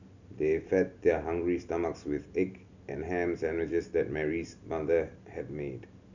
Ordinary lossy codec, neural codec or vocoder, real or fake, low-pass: none; none; real; 7.2 kHz